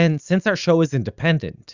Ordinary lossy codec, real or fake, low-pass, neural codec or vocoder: Opus, 64 kbps; real; 7.2 kHz; none